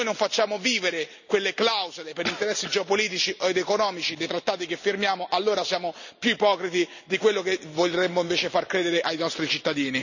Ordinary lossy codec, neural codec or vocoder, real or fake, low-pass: none; none; real; 7.2 kHz